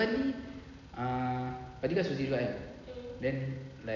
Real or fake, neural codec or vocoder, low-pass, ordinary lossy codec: real; none; 7.2 kHz; none